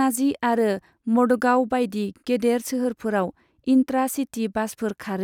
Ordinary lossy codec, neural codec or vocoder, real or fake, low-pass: none; none; real; 19.8 kHz